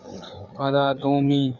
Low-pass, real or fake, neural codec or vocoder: 7.2 kHz; fake; codec, 16 kHz, 4 kbps, FreqCodec, larger model